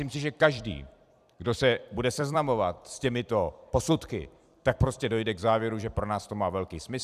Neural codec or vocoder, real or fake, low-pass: vocoder, 44.1 kHz, 128 mel bands every 256 samples, BigVGAN v2; fake; 14.4 kHz